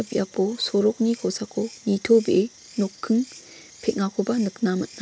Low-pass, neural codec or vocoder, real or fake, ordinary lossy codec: none; none; real; none